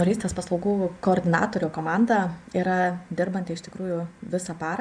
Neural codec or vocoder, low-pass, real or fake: vocoder, 24 kHz, 100 mel bands, Vocos; 9.9 kHz; fake